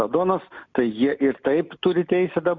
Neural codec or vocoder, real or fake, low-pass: none; real; 7.2 kHz